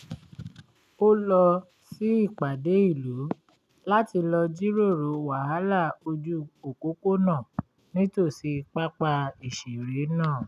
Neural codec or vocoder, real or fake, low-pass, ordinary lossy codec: none; real; 14.4 kHz; none